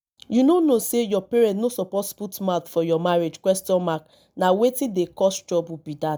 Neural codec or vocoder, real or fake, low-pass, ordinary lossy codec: none; real; none; none